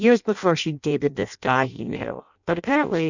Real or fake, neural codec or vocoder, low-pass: fake; codec, 16 kHz in and 24 kHz out, 0.6 kbps, FireRedTTS-2 codec; 7.2 kHz